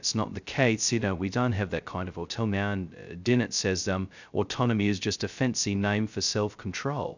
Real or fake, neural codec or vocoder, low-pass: fake; codec, 16 kHz, 0.2 kbps, FocalCodec; 7.2 kHz